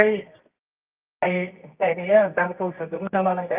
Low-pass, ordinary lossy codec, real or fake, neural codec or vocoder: 3.6 kHz; Opus, 24 kbps; fake; codec, 24 kHz, 0.9 kbps, WavTokenizer, medium music audio release